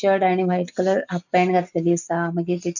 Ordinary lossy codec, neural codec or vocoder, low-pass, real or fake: none; none; 7.2 kHz; real